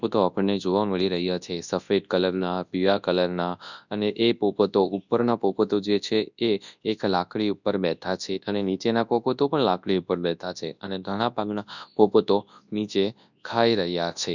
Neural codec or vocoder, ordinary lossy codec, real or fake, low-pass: codec, 24 kHz, 0.9 kbps, WavTokenizer, large speech release; none; fake; 7.2 kHz